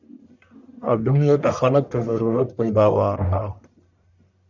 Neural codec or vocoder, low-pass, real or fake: codec, 44.1 kHz, 1.7 kbps, Pupu-Codec; 7.2 kHz; fake